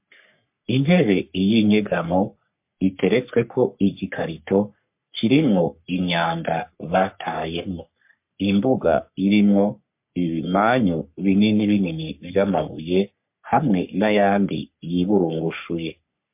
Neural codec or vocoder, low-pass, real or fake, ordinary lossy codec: codec, 44.1 kHz, 3.4 kbps, Pupu-Codec; 3.6 kHz; fake; MP3, 32 kbps